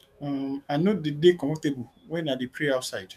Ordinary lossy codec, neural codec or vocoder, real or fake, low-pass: none; autoencoder, 48 kHz, 128 numbers a frame, DAC-VAE, trained on Japanese speech; fake; 14.4 kHz